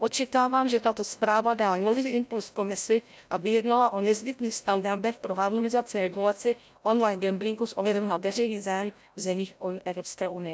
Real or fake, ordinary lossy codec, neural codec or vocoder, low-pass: fake; none; codec, 16 kHz, 0.5 kbps, FreqCodec, larger model; none